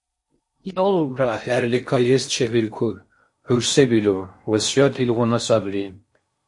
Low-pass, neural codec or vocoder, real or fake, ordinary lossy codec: 10.8 kHz; codec, 16 kHz in and 24 kHz out, 0.6 kbps, FocalCodec, streaming, 4096 codes; fake; MP3, 48 kbps